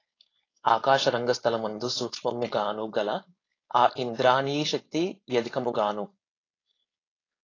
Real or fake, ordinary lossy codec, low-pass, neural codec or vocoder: fake; AAC, 32 kbps; 7.2 kHz; codec, 16 kHz, 4.8 kbps, FACodec